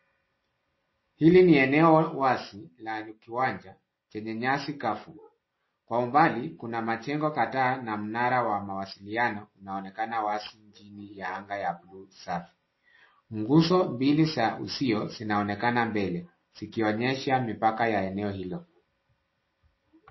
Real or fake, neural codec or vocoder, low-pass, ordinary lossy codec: real; none; 7.2 kHz; MP3, 24 kbps